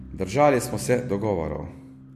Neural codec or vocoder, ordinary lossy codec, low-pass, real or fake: none; MP3, 64 kbps; 14.4 kHz; real